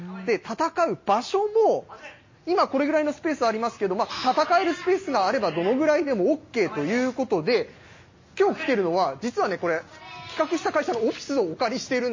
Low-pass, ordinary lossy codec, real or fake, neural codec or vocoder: 7.2 kHz; MP3, 32 kbps; real; none